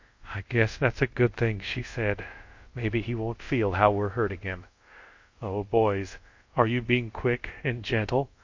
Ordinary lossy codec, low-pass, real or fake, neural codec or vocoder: MP3, 48 kbps; 7.2 kHz; fake; codec, 24 kHz, 0.5 kbps, DualCodec